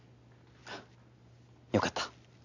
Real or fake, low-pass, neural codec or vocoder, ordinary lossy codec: real; 7.2 kHz; none; none